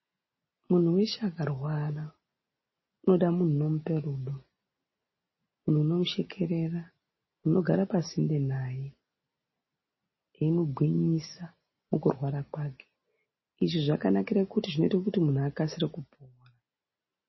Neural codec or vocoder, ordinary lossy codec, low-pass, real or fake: none; MP3, 24 kbps; 7.2 kHz; real